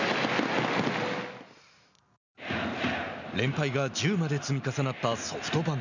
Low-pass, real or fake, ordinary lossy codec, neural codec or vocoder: 7.2 kHz; real; none; none